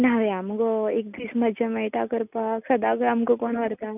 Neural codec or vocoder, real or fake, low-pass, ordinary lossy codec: none; real; 3.6 kHz; none